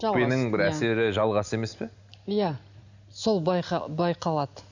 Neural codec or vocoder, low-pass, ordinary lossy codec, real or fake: none; 7.2 kHz; none; real